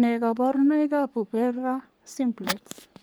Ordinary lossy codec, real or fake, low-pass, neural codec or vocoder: none; fake; none; codec, 44.1 kHz, 3.4 kbps, Pupu-Codec